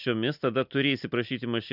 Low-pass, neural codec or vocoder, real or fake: 5.4 kHz; none; real